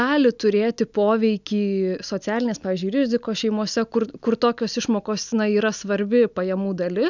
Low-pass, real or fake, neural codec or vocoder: 7.2 kHz; real; none